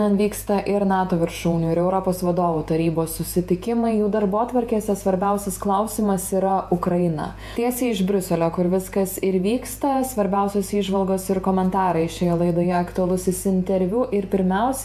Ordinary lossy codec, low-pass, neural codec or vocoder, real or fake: AAC, 96 kbps; 14.4 kHz; vocoder, 48 kHz, 128 mel bands, Vocos; fake